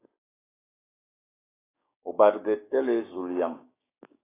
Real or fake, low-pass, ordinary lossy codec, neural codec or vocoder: fake; 3.6 kHz; AAC, 16 kbps; codec, 16 kHz, 6 kbps, DAC